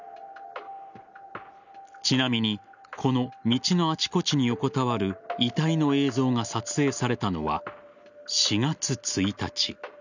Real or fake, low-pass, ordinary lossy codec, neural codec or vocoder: real; 7.2 kHz; none; none